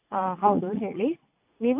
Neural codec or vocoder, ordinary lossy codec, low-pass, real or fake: vocoder, 44.1 kHz, 80 mel bands, Vocos; AAC, 32 kbps; 3.6 kHz; fake